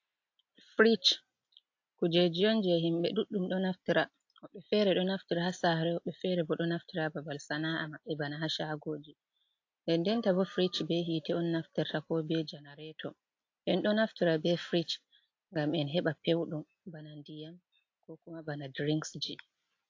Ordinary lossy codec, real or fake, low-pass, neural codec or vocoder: AAC, 48 kbps; real; 7.2 kHz; none